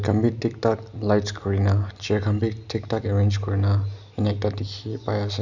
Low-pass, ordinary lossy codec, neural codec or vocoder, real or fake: 7.2 kHz; none; none; real